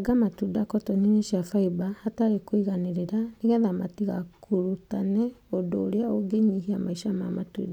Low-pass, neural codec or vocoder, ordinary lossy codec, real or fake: 19.8 kHz; none; none; real